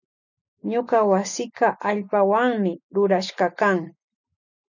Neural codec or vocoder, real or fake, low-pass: none; real; 7.2 kHz